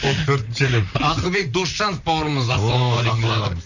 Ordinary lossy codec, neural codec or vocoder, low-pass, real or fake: none; vocoder, 44.1 kHz, 128 mel bands, Pupu-Vocoder; 7.2 kHz; fake